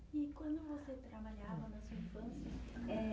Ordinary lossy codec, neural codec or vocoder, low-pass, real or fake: none; none; none; real